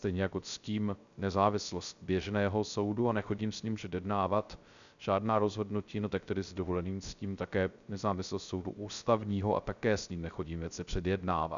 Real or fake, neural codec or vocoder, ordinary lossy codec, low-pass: fake; codec, 16 kHz, 0.3 kbps, FocalCodec; MP3, 96 kbps; 7.2 kHz